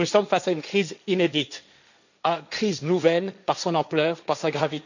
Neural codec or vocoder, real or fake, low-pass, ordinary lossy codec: codec, 16 kHz, 1.1 kbps, Voila-Tokenizer; fake; 7.2 kHz; none